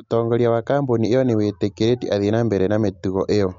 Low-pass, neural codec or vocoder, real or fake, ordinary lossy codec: 7.2 kHz; none; real; MP3, 48 kbps